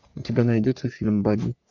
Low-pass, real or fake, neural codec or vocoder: 7.2 kHz; fake; codec, 44.1 kHz, 3.4 kbps, Pupu-Codec